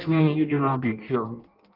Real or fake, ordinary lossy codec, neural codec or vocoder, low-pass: fake; Opus, 24 kbps; codec, 16 kHz in and 24 kHz out, 0.6 kbps, FireRedTTS-2 codec; 5.4 kHz